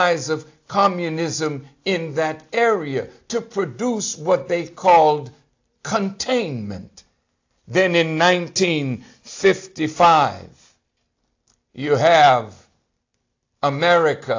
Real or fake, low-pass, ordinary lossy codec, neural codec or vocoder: real; 7.2 kHz; AAC, 48 kbps; none